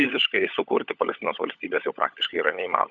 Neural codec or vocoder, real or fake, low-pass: codec, 16 kHz, 16 kbps, FunCodec, trained on LibriTTS, 50 frames a second; fake; 7.2 kHz